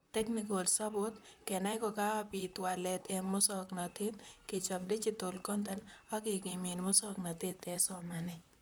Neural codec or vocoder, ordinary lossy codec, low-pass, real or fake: vocoder, 44.1 kHz, 128 mel bands, Pupu-Vocoder; none; none; fake